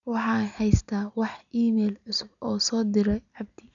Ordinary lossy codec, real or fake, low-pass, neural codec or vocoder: none; real; 7.2 kHz; none